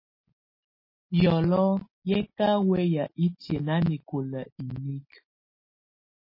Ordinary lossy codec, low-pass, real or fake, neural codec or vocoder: MP3, 24 kbps; 5.4 kHz; real; none